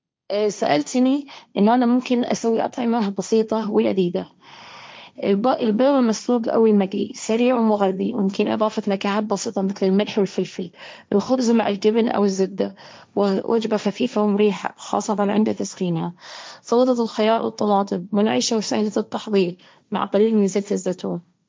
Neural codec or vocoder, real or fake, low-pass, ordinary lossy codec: codec, 16 kHz, 1.1 kbps, Voila-Tokenizer; fake; none; none